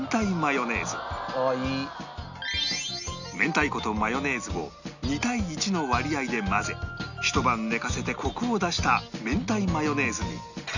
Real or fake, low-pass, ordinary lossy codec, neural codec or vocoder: real; 7.2 kHz; none; none